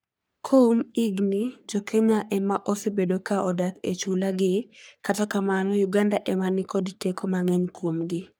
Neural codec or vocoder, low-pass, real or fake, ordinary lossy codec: codec, 44.1 kHz, 3.4 kbps, Pupu-Codec; none; fake; none